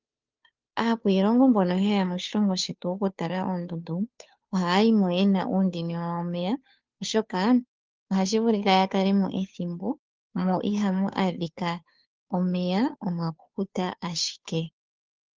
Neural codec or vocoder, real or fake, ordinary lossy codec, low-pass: codec, 16 kHz, 2 kbps, FunCodec, trained on Chinese and English, 25 frames a second; fake; Opus, 32 kbps; 7.2 kHz